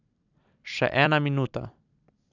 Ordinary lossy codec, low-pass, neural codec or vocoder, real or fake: none; 7.2 kHz; vocoder, 44.1 kHz, 128 mel bands every 256 samples, BigVGAN v2; fake